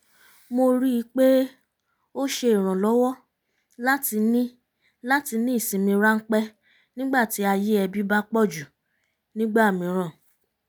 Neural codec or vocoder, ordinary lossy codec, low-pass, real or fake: none; none; none; real